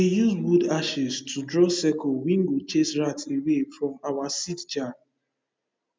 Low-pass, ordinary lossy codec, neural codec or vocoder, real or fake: none; none; none; real